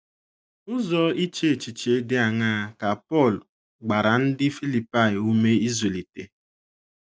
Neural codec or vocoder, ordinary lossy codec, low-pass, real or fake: none; none; none; real